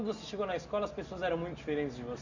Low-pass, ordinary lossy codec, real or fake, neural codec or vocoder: 7.2 kHz; none; real; none